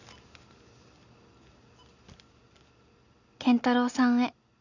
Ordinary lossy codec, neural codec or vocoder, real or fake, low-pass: none; none; real; 7.2 kHz